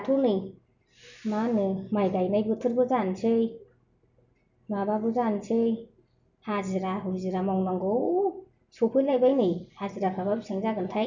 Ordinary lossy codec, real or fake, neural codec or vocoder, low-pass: none; real; none; 7.2 kHz